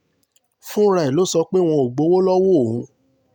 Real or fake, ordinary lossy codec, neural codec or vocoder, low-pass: real; none; none; 19.8 kHz